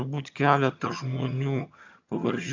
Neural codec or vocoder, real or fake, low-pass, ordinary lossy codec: vocoder, 22.05 kHz, 80 mel bands, HiFi-GAN; fake; 7.2 kHz; MP3, 64 kbps